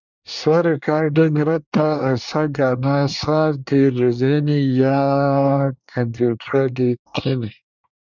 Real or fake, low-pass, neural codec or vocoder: fake; 7.2 kHz; codec, 24 kHz, 1 kbps, SNAC